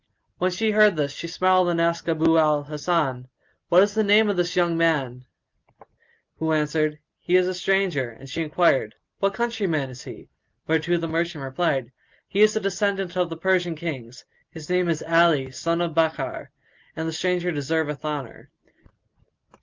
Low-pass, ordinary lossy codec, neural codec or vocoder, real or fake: 7.2 kHz; Opus, 24 kbps; none; real